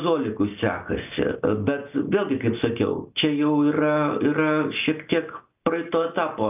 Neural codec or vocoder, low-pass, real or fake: none; 3.6 kHz; real